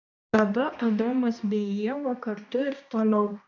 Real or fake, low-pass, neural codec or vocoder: fake; 7.2 kHz; codec, 16 kHz, 1 kbps, X-Codec, HuBERT features, trained on balanced general audio